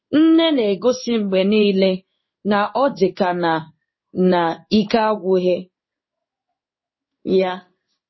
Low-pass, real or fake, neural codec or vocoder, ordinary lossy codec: 7.2 kHz; fake; codec, 16 kHz in and 24 kHz out, 1 kbps, XY-Tokenizer; MP3, 24 kbps